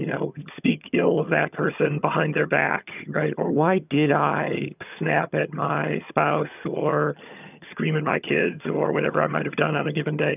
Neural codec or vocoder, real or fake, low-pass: vocoder, 22.05 kHz, 80 mel bands, HiFi-GAN; fake; 3.6 kHz